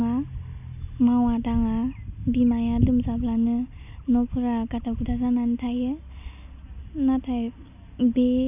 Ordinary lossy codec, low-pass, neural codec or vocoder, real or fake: none; 3.6 kHz; none; real